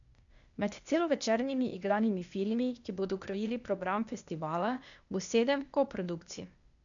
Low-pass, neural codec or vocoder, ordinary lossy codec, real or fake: 7.2 kHz; codec, 16 kHz, 0.8 kbps, ZipCodec; none; fake